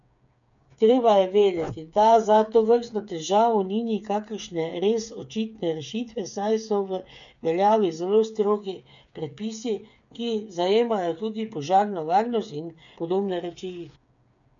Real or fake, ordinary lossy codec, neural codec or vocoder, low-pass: fake; none; codec, 16 kHz, 8 kbps, FreqCodec, smaller model; 7.2 kHz